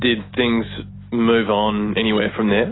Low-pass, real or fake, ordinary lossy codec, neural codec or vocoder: 7.2 kHz; real; AAC, 16 kbps; none